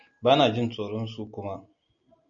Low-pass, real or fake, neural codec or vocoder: 7.2 kHz; real; none